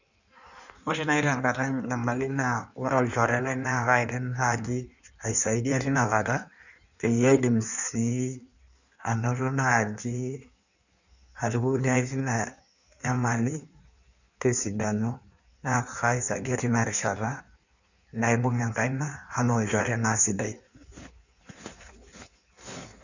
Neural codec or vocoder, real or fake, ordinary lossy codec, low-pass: codec, 16 kHz in and 24 kHz out, 1.1 kbps, FireRedTTS-2 codec; fake; none; 7.2 kHz